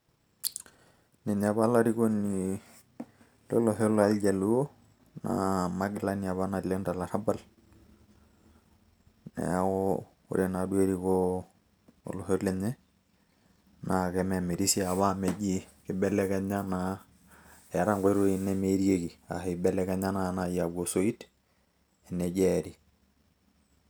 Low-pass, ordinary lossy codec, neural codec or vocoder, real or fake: none; none; none; real